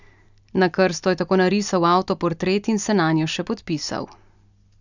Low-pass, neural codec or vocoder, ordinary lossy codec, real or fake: 7.2 kHz; none; none; real